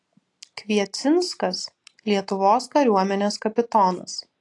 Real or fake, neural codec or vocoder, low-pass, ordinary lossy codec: real; none; 10.8 kHz; AAC, 48 kbps